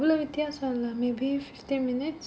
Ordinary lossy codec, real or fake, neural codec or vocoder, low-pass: none; real; none; none